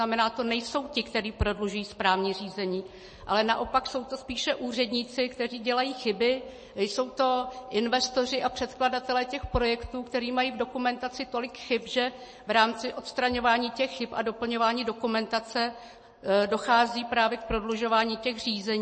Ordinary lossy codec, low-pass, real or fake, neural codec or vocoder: MP3, 32 kbps; 9.9 kHz; real; none